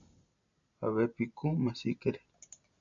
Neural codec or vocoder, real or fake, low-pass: none; real; 7.2 kHz